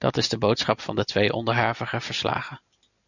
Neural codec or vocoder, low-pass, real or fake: none; 7.2 kHz; real